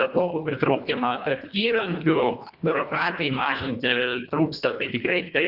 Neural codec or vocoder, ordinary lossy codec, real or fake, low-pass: codec, 24 kHz, 1.5 kbps, HILCodec; Opus, 64 kbps; fake; 5.4 kHz